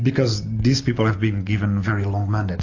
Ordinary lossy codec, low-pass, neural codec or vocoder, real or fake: AAC, 48 kbps; 7.2 kHz; none; real